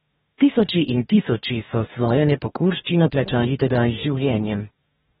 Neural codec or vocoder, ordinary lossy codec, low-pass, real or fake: codec, 44.1 kHz, 2.6 kbps, DAC; AAC, 16 kbps; 19.8 kHz; fake